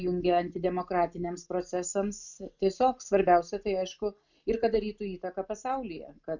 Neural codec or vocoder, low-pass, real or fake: none; 7.2 kHz; real